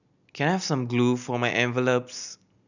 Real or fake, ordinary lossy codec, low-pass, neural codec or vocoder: real; none; 7.2 kHz; none